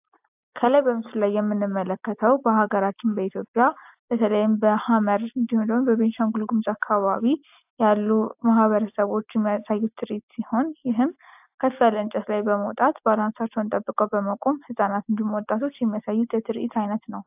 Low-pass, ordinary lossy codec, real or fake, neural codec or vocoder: 3.6 kHz; AAC, 32 kbps; real; none